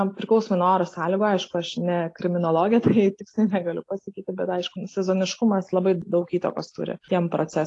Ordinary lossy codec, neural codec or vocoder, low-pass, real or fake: AAC, 48 kbps; none; 10.8 kHz; real